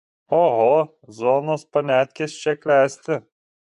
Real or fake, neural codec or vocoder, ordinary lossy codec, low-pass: fake; vocoder, 24 kHz, 100 mel bands, Vocos; AAC, 96 kbps; 10.8 kHz